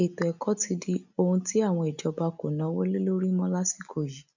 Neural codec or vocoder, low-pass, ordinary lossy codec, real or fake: none; 7.2 kHz; none; real